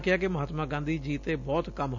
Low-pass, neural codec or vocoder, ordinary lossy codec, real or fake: 7.2 kHz; none; none; real